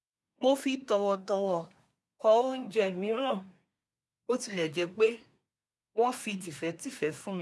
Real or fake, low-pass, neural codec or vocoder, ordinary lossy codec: fake; none; codec, 24 kHz, 1 kbps, SNAC; none